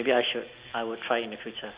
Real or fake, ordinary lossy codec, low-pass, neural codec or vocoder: real; Opus, 64 kbps; 3.6 kHz; none